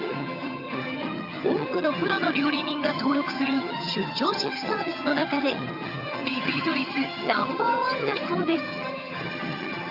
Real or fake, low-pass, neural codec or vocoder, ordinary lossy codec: fake; 5.4 kHz; vocoder, 22.05 kHz, 80 mel bands, HiFi-GAN; Opus, 64 kbps